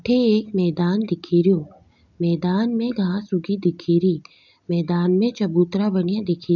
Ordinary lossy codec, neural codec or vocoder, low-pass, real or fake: none; none; 7.2 kHz; real